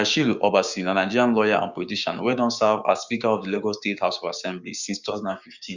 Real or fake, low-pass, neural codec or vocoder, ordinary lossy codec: fake; 7.2 kHz; codec, 16 kHz, 6 kbps, DAC; Opus, 64 kbps